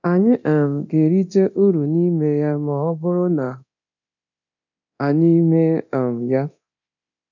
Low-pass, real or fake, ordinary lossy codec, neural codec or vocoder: 7.2 kHz; fake; none; codec, 24 kHz, 0.9 kbps, DualCodec